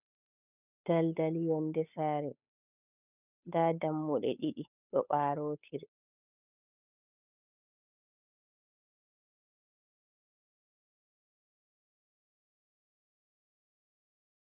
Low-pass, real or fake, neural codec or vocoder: 3.6 kHz; fake; codec, 16 kHz, 8 kbps, FunCodec, trained on Chinese and English, 25 frames a second